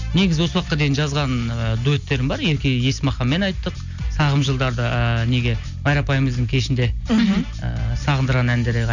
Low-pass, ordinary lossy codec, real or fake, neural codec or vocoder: 7.2 kHz; none; real; none